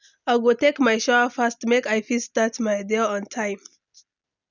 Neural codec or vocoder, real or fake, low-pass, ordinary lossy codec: none; real; 7.2 kHz; none